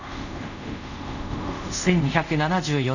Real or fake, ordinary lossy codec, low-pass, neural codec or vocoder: fake; none; 7.2 kHz; codec, 24 kHz, 0.5 kbps, DualCodec